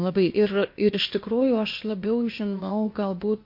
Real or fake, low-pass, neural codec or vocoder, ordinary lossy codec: fake; 5.4 kHz; codec, 16 kHz, 0.8 kbps, ZipCodec; MP3, 32 kbps